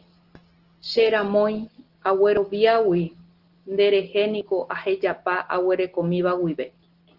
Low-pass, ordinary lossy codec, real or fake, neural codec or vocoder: 5.4 kHz; Opus, 16 kbps; real; none